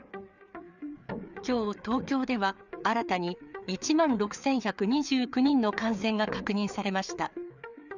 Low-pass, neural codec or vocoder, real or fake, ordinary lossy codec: 7.2 kHz; codec, 16 kHz, 4 kbps, FreqCodec, larger model; fake; none